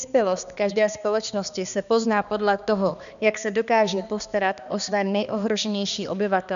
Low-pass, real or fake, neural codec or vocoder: 7.2 kHz; fake; codec, 16 kHz, 2 kbps, X-Codec, HuBERT features, trained on balanced general audio